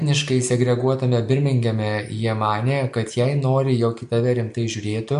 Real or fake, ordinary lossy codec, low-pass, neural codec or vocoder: real; MP3, 64 kbps; 10.8 kHz; none